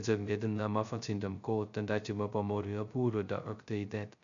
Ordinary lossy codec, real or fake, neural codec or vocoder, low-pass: none; fake; codec, 16 kHz, 0.2 kbps, FocalCodec; 7.2 kHz